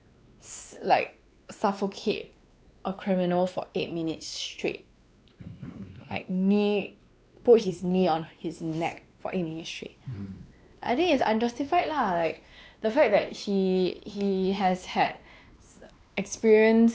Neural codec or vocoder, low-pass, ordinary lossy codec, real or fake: codec, 16 kHz, 2 kbps, X-Codec, WavLM features, trained on Multilingual LibriSpeech; none; none; fake